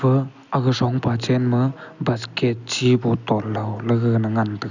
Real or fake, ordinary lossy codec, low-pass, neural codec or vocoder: real; none; 7.2 kHz; none